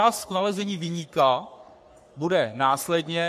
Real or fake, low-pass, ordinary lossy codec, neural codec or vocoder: fake; 14.4 kHz; MP3, 64 kbps; codec, 44.1 kHz, 3.4 kbps, Pupu-Codec